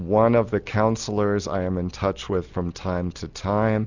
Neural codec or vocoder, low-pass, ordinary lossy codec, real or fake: none; 7.2 kHz; Opus, 64 kbps; real